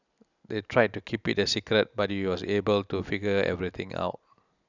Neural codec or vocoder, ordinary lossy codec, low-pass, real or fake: none; none; 7.2 kHz; real